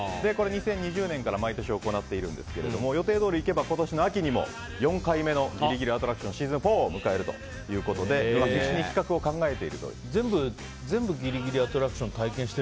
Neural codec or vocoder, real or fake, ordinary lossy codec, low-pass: none; real; none; none